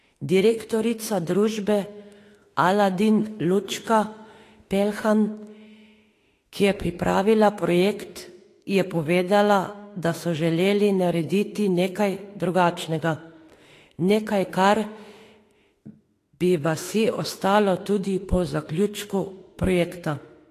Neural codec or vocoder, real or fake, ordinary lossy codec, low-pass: autoencoder, 48 kHz, 32 numbers a frame, DAC-VAE, trained on Japanese speech; fake; AAC, 48 kbps; 14.4 kHz